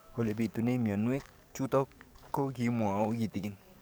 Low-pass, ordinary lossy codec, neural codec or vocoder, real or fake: none; none; codec, 44.1 kHz, 7.8 kbps, DAC; fake